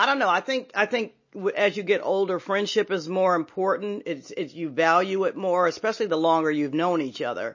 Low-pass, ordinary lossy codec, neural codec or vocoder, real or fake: 7.2 kHz; MP3, 32 kbps; none; real